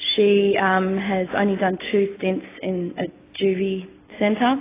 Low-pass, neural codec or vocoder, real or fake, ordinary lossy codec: 3.6 kHz; none; real; AAC, 16 kbps